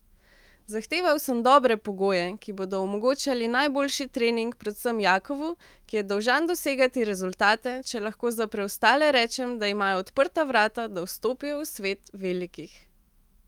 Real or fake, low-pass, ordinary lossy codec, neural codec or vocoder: fake; 19.8 kHz; Opus, 32 kbps; autoencoder, 48 kHz, 128 numbers a frame, DAC-VAE, trained on Japanese speech